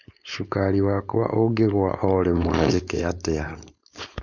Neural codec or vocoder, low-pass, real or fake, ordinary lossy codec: codec, 16 kHz, 4.8 kbps, FACodec; 7.2 kHz; fake; none